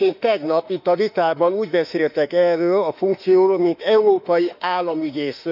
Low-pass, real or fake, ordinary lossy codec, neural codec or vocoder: 5.4 kHz; fake; none; autoencoder, 48 kHz, 32 numbers a frame, DAC-VAE, trained on Japanese speech